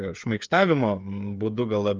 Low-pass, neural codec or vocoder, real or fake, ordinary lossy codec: 7.2 kHz; codec, 16 kHz, 8 kbps, FreqCodec, smaller model; fake; Opus, 24 kbps